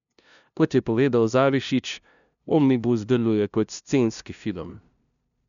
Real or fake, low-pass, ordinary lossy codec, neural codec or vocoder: fake; 7.2 kHz; none; codec, 16 kHz, 0.5 kbps, FunCodec, trained on LibriTTS, 25 frames a second